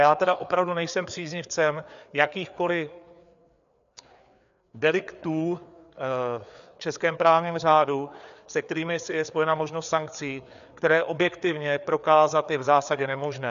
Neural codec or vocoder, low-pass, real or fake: codec, 16 kHz, 4 kbps, FreqCodec, larger model; 7.2 kHz; fake